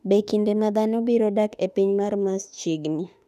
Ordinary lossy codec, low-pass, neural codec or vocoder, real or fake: none; 14.4 kHz; autoencoder, 48 kHz, 32 numbers a frame, DAC-VAE, trained on Japanese speech; fake